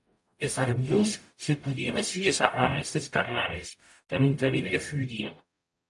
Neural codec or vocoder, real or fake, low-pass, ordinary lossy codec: codec, 44.1 kHz, 0.9 kbps, DAC; fake; 10.8 kHz; MP3, 96 kbps